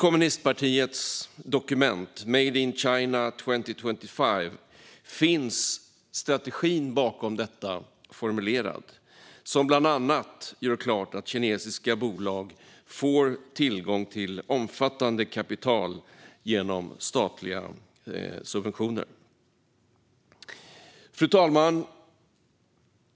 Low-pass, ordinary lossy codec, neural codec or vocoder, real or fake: none; none; none; real